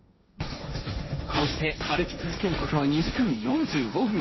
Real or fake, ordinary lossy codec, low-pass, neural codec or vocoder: fake; MP3, 24 kbps; 7.2 kHz; codec, 16 kHz, 1.1 kbps, Voila-Tokenizer